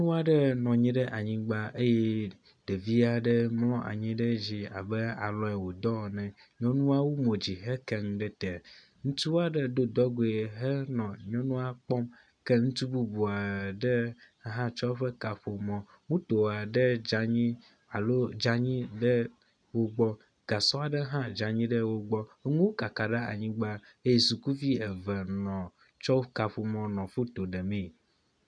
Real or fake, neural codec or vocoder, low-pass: real; none; 9.9 kHz